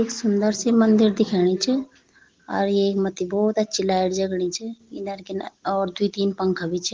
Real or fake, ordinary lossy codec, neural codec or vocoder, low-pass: real; Opus, 16 kbps; none; 7.2 kHz